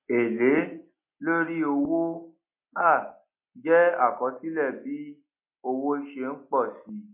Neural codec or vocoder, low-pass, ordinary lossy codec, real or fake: none; 3.6 kHz; MP3, 24 kbps; real